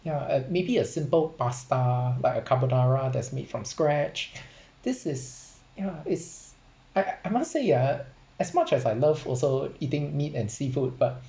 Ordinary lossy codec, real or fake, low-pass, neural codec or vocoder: none; real; none; none